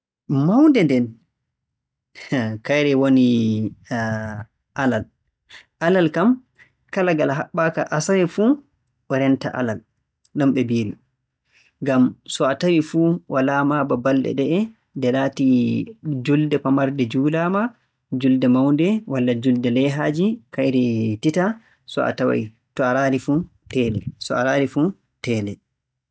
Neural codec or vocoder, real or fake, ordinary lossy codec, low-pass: none; real; none; none